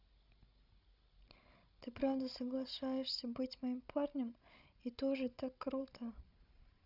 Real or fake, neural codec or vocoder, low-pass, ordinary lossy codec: fake; codec, 16 kHz, 16 kbps, FreqCodec, larger model; 5.4 kHz; none